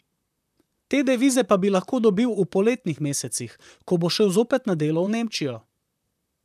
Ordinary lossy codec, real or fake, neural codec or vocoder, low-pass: none; fake; vocoder, 44.1 kHz, 128 mel bands, Pupu-Vocoder; 14.4 kHz